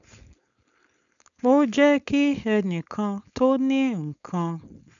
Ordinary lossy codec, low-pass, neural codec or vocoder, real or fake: none; 7.2 kHz; codec, 16 kHz, 4.8 kbps, FACodec; fake